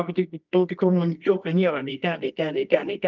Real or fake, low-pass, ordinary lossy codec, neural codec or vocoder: fake; 7.2 kHz; Opus, 24 kbps; codec, 24 kHz, 0.9 kbps, WavTokenizer, medium music audio release